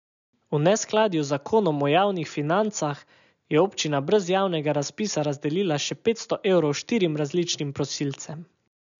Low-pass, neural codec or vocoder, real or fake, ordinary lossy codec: 7.2 kHz; none; real; none